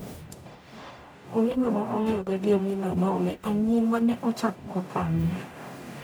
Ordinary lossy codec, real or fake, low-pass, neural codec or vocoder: none; fake; none; codec, 44.1 kHz, 0.9 kbps, DAC